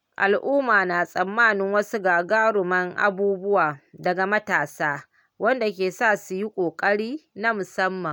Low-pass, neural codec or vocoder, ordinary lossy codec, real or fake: none; none; none; real